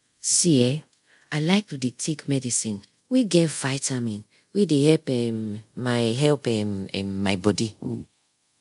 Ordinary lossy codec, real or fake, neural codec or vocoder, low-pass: MP3, 64 kbps; fake; codec, 24 kHz, 0.5 kbps, DualCodec; 10.8 kHz